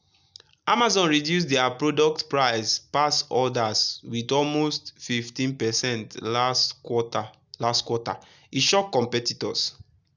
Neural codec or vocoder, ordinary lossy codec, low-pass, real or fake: none; none; 7.2 kHz; real